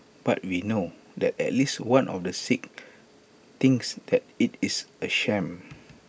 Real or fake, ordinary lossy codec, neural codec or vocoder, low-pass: real; none; none; none